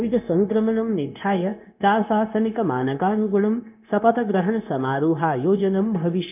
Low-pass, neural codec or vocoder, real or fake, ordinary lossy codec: 3.6 kHz; codec, 16 kHz, 0.9 kbps, LongCat-Audio-Codec; fake; none